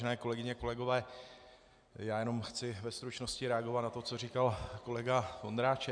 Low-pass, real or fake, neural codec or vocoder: 9.9 kHz; real; none